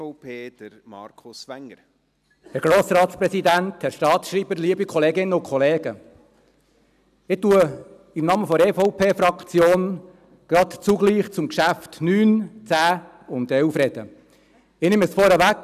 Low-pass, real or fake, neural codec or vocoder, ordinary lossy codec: 14.4 kHz; real; none; none